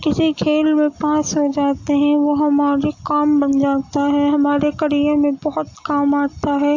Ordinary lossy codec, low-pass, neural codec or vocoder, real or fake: AAC, 48 kbps; 7.2 kHz; none; real